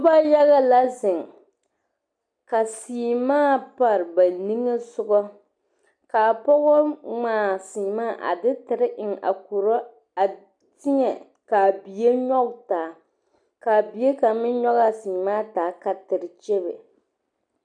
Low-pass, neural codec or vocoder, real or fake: 9.9 kHz; none; real